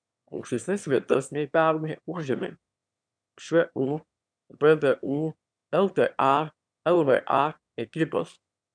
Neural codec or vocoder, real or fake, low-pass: autoencoder, 22.05 kHz, a latent of 192 numbers a frame, VITS, trained on one speaker; fake; 9.9 kHz